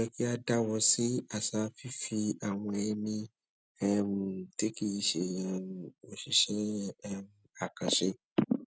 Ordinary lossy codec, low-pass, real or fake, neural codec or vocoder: none; none; real; none